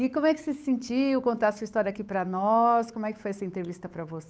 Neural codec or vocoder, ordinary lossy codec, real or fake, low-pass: codec, 16 kHz, 8 kbps, FunCodec, trained on Chinese and English, 25 frames a second; none; fake; none